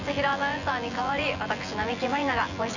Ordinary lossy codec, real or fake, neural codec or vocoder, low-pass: none; fake; vocoder, 24 kHz, 100 mel bands, Vocos; 7.2 kHz